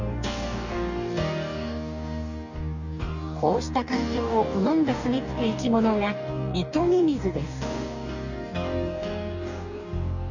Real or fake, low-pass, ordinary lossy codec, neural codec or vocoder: fake; 7.2 kHz; none; codec, 44.1 kHz, 2.6 kbps, DAC